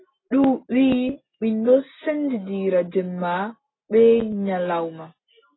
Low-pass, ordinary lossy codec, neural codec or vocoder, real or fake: 7.2 kHz; AAC, 16 kbps; none; real